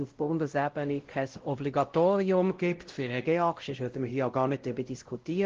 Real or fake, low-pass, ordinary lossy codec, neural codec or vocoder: fake; 7.2 kHz; Opus, 16 kbps; codec, 16 kHz, 0.5 kbps, X-Codec, WavLM features, trained on Multilingual LibriSpeech